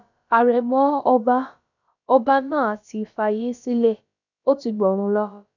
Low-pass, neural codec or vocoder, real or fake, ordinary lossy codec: 7.2 kHz; codec, 16 kHz, about 1 kbps, DyCAST, with the encoder's durations; fake; none